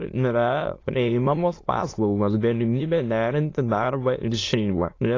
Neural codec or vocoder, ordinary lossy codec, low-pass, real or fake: autoencoder, 22.05 kHz, a latent of 192 numbers a frame, VITS, trained on many speakers; AAC, 32 kbps; 7.2 kHz; fake